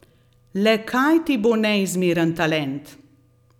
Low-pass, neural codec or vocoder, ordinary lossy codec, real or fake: 19.8 kHz; none; none; real